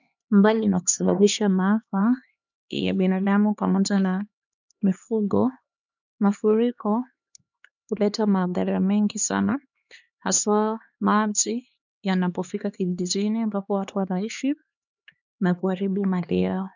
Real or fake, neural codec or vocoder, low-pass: fake; codec, 16 kHz, 2 kbps, X-Codec, HuBERT features, trained on LibriSpeech; 7.2 kHz